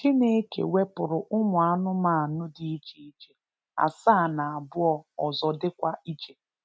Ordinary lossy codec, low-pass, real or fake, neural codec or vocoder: none; none; real; none